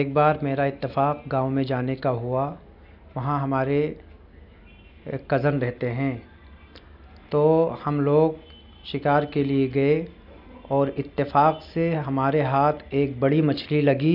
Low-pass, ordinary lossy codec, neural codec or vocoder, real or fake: 5.4 kHz; none; none; real